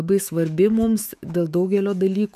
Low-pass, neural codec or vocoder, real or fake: 14.4 kHz; autoencoder, 48 kHz, 128 numbers a frame, DAC-VAE, trained on Japanese speech; fake